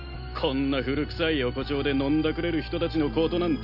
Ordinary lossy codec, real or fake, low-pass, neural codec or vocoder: none; real; 5.4 kHz; none